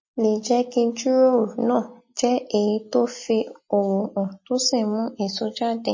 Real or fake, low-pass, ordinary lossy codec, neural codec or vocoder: real; 7.2 kHz; MP3, 32 kbps; none